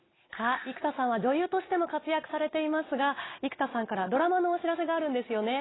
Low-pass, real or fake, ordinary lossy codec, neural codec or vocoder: 7.2 kHz; real; AAC, 16 kbps; none